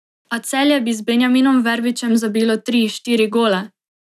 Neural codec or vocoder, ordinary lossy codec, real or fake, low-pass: none; none; real; 14.4 kHz